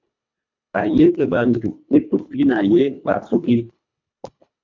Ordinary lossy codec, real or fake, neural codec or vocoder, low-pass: MP3, 64 kbps; fake; codec, 24 kHz, 1.5 kbps, HILCodec; 7.2 kHz